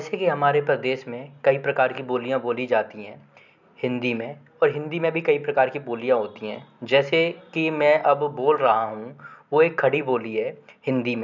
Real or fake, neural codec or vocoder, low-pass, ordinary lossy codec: real; none; 7.2 kHz; none